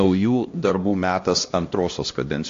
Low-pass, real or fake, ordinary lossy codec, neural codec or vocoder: 7.2 kHz; fake; AAC, 48 kbps; codec, 16 kHz, 1 kbps, X-Codec, HuBERT features, trained on LibriSpeech